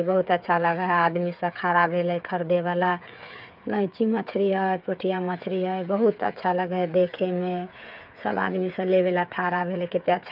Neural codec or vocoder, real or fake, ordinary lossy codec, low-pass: codec, 16 kHz, 8 kbps, FreqCodec, smaller model; fake; none; 5.4 kHz